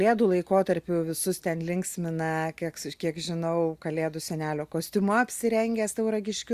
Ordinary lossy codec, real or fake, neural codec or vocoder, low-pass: Opus, 64 kbps; real; none; 14.4 kHz